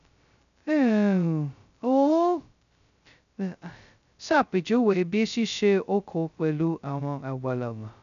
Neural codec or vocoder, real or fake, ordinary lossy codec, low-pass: codec, 16 kHz, 0.2 kbps, FocalCodec; fake; none; 7.2 kHz